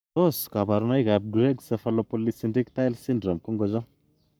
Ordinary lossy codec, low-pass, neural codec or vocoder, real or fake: none; none; codec, 44.1 kHz, 7.8 kbps, Pupu-Codec; fake